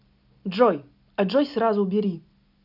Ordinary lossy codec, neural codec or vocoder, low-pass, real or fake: none; none; 5.4 kHz; real